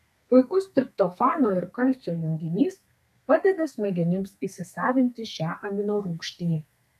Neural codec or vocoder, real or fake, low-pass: codec, 32 kHz, 1.9 kbps, SNAC; fake; 14.4 kHz